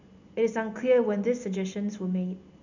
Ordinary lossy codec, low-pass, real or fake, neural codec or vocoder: none; 7.2 kHz; real; none